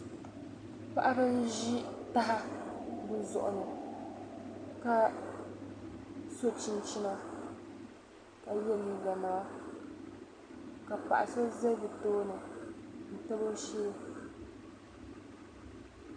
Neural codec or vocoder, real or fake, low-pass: vocoder, 44.1 kHz, 128 mel bands every 256 samples, BigVGAN v2; fake; 9.9 kHz